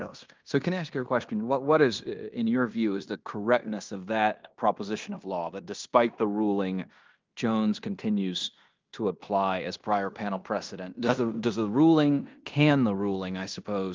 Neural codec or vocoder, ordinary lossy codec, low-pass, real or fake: codec, 16 kHz in and 24 kHz out, 0.9 kbps, LongCat-Audio-Codec, fine tuned four codebook decoder; Opus, 24 kbps; 7.2 kHz; fake